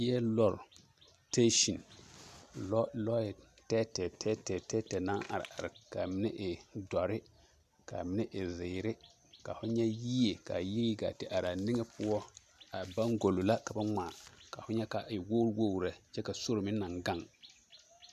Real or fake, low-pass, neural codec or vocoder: real; 14.4 kHz; none